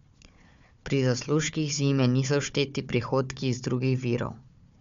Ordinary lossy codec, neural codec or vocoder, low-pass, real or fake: MP3, 64 kbps; codec, 16 kHz, 4 kbps, FunCodec, trained on Chinese and English, 50 frames a second; 7.2 kHz; fake